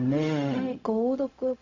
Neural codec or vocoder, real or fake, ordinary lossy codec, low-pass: codec, 16 kHz, 0.4 kbps, LongCat-Audio-Codec; fake; AAC, 48 kbps; 7.2 kHz